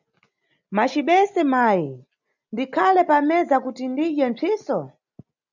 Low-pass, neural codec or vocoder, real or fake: 7.2 kHz; none; real